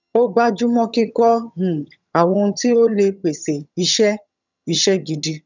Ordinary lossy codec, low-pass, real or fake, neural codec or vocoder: none; 7.2 kHz; fake; vocoder, 22.05 kHz, 80 mel bands, HiFi-GAN